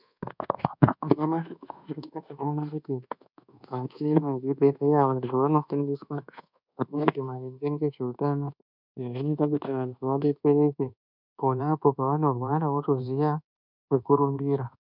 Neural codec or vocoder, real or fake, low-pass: codec, 24 kHz, 1.2 kbps, DualCodec; fake; 5.4 kHz